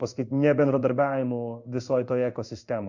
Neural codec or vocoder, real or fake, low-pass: codec, 16 kHz in and 24 kHz out, 1 kbps, XY-Tokenizer; fake; 7.2 kHz